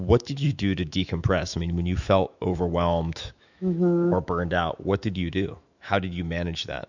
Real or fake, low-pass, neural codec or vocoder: fake; 7.2 kHz; autoencoder, 48 kHz, 128 numbers a frame, DAC-VAE, trained on Japanese speech